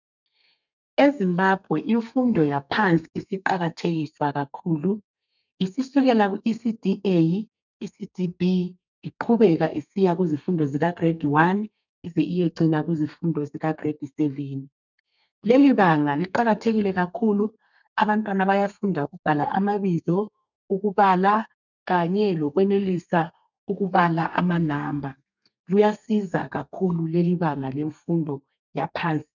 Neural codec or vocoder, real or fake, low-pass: codec, 32 kHz, 1.9 kbps, SNAC; fake; 7.2 kHz